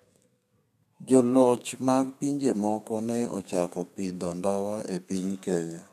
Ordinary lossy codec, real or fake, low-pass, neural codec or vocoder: none; fake; 14.4 kHz; codec, 32 kHz, 1.9 kbps, SNAC